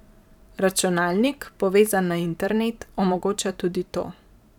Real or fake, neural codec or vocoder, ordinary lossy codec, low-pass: fake; vocoder, 44.1 kHz, 128 mel bands every 512 samples, BigVGAN v2; none; 19.8 kHz